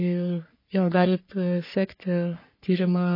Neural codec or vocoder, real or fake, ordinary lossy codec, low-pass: codec, 44.1 kHz, 3.4 kbps, Pupu-Codec; fake; MP3, 24 kbps; 5.4 kHz